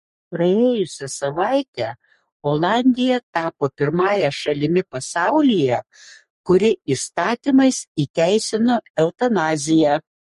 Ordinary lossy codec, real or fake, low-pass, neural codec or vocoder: MP3, 48 kbps; fake; 14.4 kHz; codec, 44.1 kHz, 3.4 kbps, Pupu-Codec